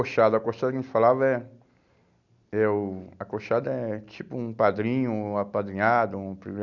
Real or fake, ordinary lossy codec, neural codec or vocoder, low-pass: real; none; none; 7.2 kHz